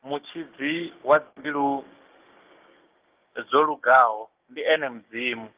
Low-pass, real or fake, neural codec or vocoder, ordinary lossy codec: 3.6 kHz; fake; codec, 16 kHz, 6 kbps, DAC; Opus, 32 kbps